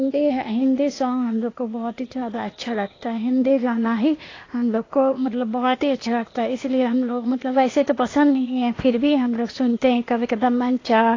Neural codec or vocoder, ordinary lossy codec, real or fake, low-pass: codec, 16 kHz, 0.8 kbps, ZipCodec; AAC, 32 kbps; fake; 7.2 kHz